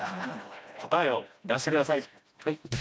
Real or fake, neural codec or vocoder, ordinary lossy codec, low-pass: fake; codec, 16 kHz, 1 kbps, FreqCodec, smaller model; none; none